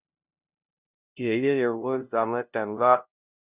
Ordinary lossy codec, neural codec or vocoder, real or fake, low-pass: Opus, 64 kbps; codec, 16 kHz, 0.5 kbps, FunCodec, trained on LibriTTS, 25 frames a second; fake; 3.6 kHz